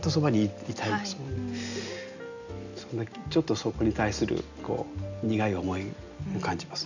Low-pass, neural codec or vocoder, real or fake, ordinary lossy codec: 7.2 kHz; none; real; none